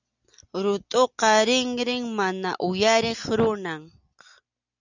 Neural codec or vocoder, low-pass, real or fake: none; 7.2 kHz; real